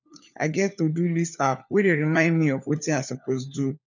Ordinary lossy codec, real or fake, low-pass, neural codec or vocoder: none; fake; 7.2 kHz; codec, 16 kHz, 4 kbps, FunCodec, trained on LibriTTS, 50 frames a second